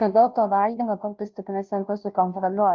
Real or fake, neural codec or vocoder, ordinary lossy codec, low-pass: fake; codec, 16 kHz, 0.5 kbps, FunCodec, trained on Chinese and English, 25 frames a second; Opus, 24 kbps; 7.2 kHz